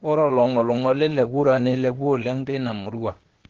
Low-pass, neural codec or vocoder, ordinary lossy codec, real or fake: 7.2 kHz; codec, 16 kHz, 0.8 kbps, ZipCodec; Opus, 16 kbps; fake